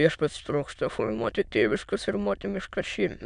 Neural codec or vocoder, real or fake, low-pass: autoencoder, 22.05 kHz, a latent of 192 numbers a frame, VITS, trained on many speakers; fake; 9.9 kHz